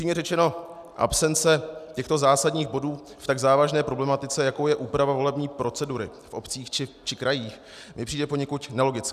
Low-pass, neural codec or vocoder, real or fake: 14.4 kHz; none; real